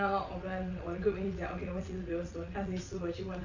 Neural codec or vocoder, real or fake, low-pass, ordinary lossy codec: vocoder, 22.05 kHz, 80 mel bands, WaveNeXt; fake; 7.2 kHz; AAC, 48 kbps